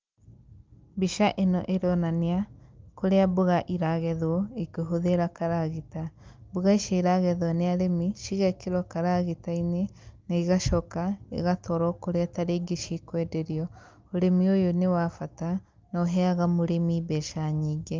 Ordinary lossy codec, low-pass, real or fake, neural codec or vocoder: Opus, 24 kbps; 7.2 kHz; real; none